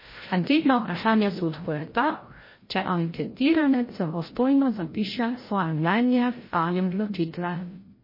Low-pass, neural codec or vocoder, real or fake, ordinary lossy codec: 5.4 kHz; codec, 16 kHz, 0.5 kbps, FreqCodec, larger model; fake; MP3, 24 kbps